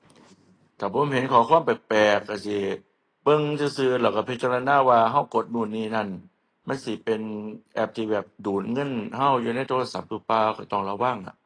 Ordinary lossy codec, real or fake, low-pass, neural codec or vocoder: AAC, 32 kbps; real; 9.9 kHz; none